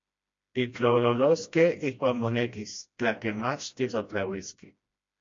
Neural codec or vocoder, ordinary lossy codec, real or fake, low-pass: codec, 16 kHz, 1 kbps, FreqCodec, smaller model; MP3, 48 kbps; fake; 7.2 kHz